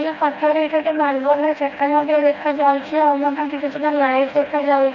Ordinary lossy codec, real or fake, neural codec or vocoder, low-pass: none; fake; codec, 16 kHz, 1 kbps, FreqCodec, smaller model; 7.2 kHz